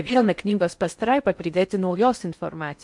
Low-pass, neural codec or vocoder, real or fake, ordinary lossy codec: 10.8 kHz; codec, 16 kHz in and 24 kHz out, 0.6 kbps, FocalCodec, streaming, 4096 codes; fake; MP3, 64 kbps